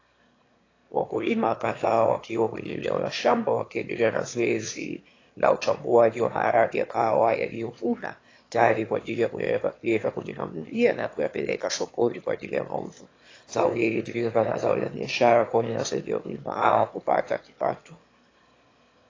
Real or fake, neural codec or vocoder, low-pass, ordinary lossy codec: fake; autoencoder, 22.05 kHz, a latent of 192 numbers a frame, VITS, trained on one speaker; 7.2 kHz; AAC, 32 kbps